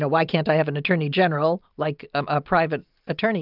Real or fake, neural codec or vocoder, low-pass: real; none; 5.4 kHz